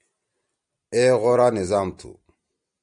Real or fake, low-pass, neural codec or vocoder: real; 9.9 kHz; none